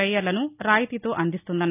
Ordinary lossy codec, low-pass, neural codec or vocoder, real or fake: none; 3.6 kHz; none; real